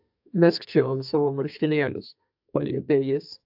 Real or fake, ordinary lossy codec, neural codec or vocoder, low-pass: fake; AAC, 48 kbps; codec, 32 kHz, 1.9 kbps, SNAC; 5.4 kHz